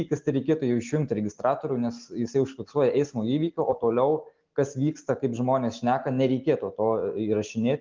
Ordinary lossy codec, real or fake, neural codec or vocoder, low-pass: Opus, 32 kbps; real; none; 7.2 kHz